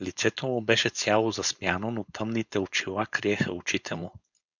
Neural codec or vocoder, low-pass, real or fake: codec, 16 kHz, 4.8 kbps, FACodec; 7.2 kHz; fake